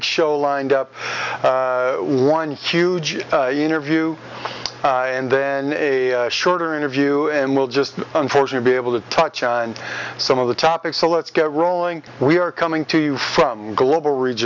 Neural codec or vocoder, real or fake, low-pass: none; real; 7.2 kHz